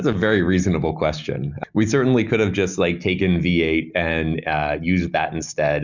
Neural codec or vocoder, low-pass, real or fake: none; 7.2 kHz; real